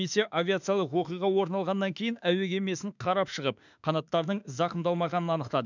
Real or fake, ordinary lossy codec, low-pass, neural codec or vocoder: fake; none; 7.2 kHz; autoencoder, 48 kHz, 32 numbers a frame, DAC-VAE, trained on Japanese speech